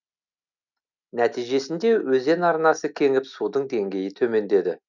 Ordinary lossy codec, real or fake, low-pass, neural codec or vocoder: none; real; 7.2 kHz; none